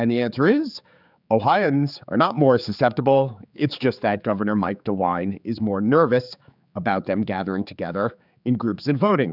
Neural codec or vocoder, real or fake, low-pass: codec, 16 kHz, 4 kbps, X-Codec, HuBERT features, trained on general audio; fake; 5.4 kHz